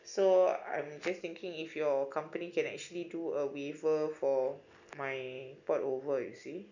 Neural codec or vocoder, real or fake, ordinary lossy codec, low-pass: none; real; none; 7.2 kHz